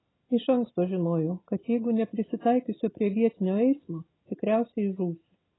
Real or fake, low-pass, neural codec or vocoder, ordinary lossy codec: real; 7.2 kHz; none; AAC, 16 kbps